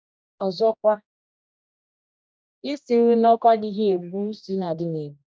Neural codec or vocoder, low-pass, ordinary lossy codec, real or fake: codec, 16 kHz, 1 kbps, X-Codec, HuBERT features, trained on general audio; none; none; fake